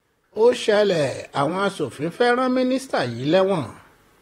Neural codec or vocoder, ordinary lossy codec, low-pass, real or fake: vocoder, 44.1 kHz, 128 mel bands, Pupu-Vocoder; AAC, 48 kbps; 19.8 kHz; fake